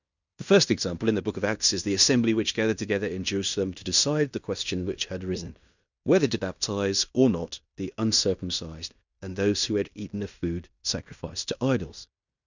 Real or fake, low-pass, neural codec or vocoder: fake; 7.2 kHz; codec, 16 kHz in and 24 kHz out, 0.9 kbps, LongCat-Audio-Codec, fine tuned four codebook decoder